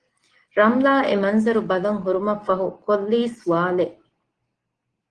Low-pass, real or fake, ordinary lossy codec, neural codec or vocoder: 9.9 kHz; real; Opus, 16 kbps; none